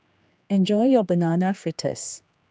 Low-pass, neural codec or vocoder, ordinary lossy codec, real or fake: none; codec, 16 kHz, 2 kbps, X-Codec, HuBERT features, trained on general audio; none; fake